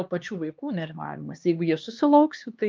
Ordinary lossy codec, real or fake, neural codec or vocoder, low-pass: Opus, 24 kbps; fake; codec, 16 kHz, 2 kbps, X-Codec, HuBERT features, trained on LibriSpeech; 7.2 kHz